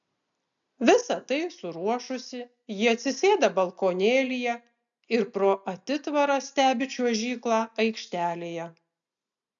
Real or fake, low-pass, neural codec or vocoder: real; 7.2 kHz; none